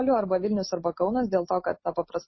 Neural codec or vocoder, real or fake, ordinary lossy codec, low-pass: none; real; MP3, 24 kbps; 7.2 kHz